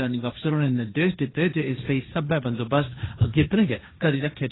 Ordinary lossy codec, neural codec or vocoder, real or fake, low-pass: AAC, 16 kbps; codec, 16 kHz, 1.1 kbps, Voila-Tokenizer; fake; 7.2 kHz